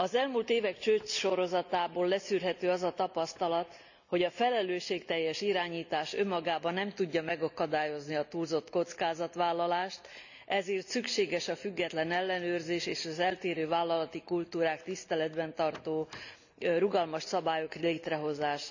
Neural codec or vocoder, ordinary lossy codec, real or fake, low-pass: none; none; real; 7.2 kHz